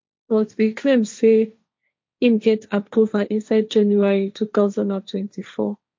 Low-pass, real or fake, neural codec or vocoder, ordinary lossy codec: 7.2 kHz; fake; codec, 16 kHz, 1.1 kbps, Voila-Tokenizer; MP3, 48 kbps